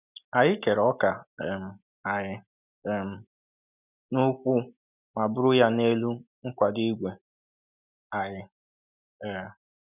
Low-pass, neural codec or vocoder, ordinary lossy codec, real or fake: 3.6 kHz; none; none; real